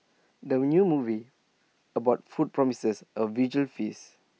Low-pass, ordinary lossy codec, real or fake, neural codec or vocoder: none; none; real; none